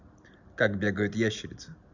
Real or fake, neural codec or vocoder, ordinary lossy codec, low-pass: real; none; none; 7.2 kHz